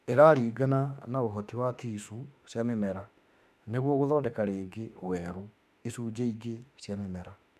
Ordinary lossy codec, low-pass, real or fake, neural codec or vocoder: none; 14.4 kHz; fake; autoencoder, 48 kHz, 32 numbers a frame, DAC-VAE, trained on Japanese speech